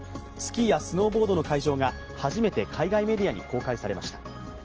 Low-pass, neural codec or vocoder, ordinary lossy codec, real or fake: 7.2 kHz; none; Opus, 16 kbps; real